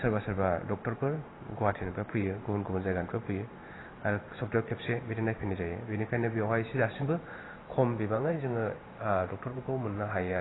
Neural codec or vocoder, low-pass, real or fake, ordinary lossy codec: vocoder, 44.1 kHz, 128 mel bands every 512 samples, BigVGAN v2; 7.2 kHz; fake; AAC, 16 kbps